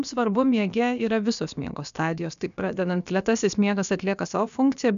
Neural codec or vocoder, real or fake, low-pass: codec, 16 kHz, about 1 kbps, DyCAST, with the encoder's durations; fake; 7.2 kHz